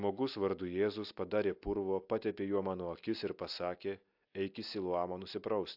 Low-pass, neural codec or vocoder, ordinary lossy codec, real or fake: 5.4 kHz; none; AAC, 48 kbps; real